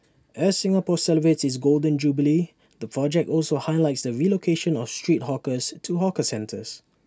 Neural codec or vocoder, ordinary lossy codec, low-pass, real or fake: none; none; none; real